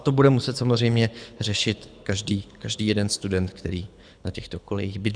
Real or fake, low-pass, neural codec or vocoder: fake; 9.9 kHz; codec, 24 kHz, 6 kbps, HILCodec